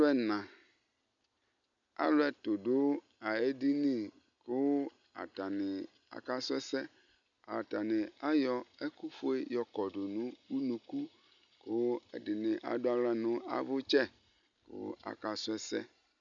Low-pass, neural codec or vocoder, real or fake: 7.2 kHz; none; real